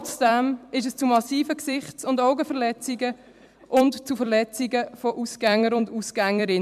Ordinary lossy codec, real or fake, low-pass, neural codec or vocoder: MP3, 96 kbps; fake; 14.4 kHz; vocoder, 44.1 kHz, 128 mel bands every 256 samples, BigVGAN v2